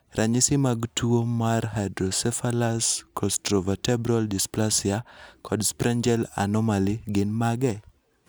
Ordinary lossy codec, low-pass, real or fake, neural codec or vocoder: none; none; real; none